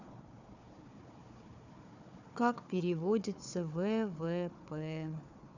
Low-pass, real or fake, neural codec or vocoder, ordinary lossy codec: 7.2 kHz; fake; codec, 16 kHz, 4 kbps, FunCodec, trained on Chinese and English, 50 frames a second; none